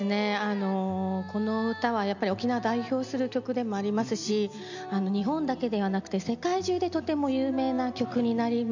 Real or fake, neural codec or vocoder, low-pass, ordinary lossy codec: real; none; 7.2 kHz; none